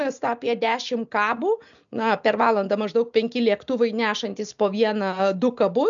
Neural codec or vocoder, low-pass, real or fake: none; 7.2 kHz; real